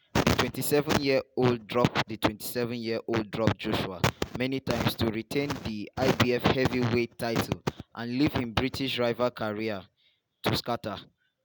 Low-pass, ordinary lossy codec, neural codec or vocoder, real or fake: none; none; none; real